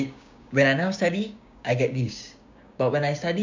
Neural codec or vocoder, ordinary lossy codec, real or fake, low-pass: codec, 16 kHz, 6 kbps, DAC; MP3, 64 kbps; fake; 7.2 kHz